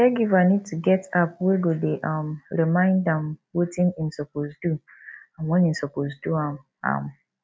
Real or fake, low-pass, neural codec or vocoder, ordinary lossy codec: real; none; none; none